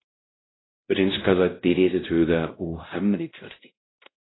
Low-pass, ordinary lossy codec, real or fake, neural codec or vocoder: 7.2 kHz; AAC, 16 kbps; fake; codec, 16 kHz, 0.5 kbps, X-Codec, WavLM features, trained on Multilingual LibriSpeech